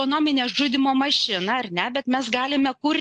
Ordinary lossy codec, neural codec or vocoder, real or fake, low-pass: AAC, 64 kbps; none; real; 9.9 kHz